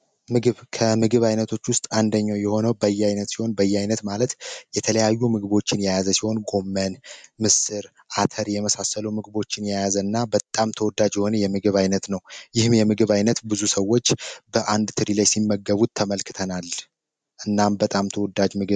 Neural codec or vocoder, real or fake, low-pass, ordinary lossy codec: none; real; 9.9 kHz; MP3, 96 kbps